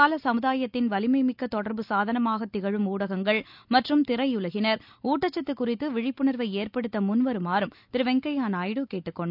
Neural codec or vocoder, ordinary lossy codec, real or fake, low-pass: none; none; real; 5.4 kHz